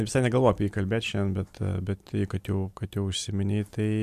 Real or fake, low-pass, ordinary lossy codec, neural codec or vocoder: fake; 14.4 kHz; MP3, 96 kbps; vocoder, 44.1 kHz, 128 mel bands every 256 samples, BigVGAN v2